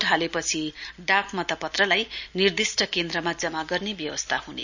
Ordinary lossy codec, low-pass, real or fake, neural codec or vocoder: none; 7.2 kHz; real; none